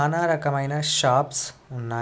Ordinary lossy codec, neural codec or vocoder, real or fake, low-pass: none; none; real; none